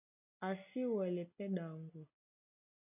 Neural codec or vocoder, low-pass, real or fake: none; 3.6 kHz; real